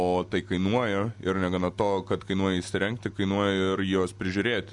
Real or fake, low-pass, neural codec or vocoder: real; 10.8 kHz; none